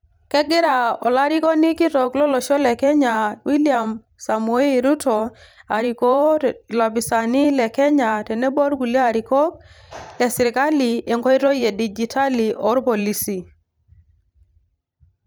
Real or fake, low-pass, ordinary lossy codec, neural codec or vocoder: fake; none; none; vocoder, 44.1 kHz, 128 mel bands every 512 samples, BigVGAN v2